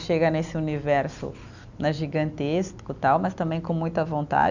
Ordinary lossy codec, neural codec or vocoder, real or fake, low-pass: none; none; real; 7.2 kHz